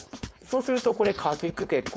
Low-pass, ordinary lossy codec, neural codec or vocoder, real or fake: none; none; codec, 16 kHz, 4.8 kbps, FACodec; fake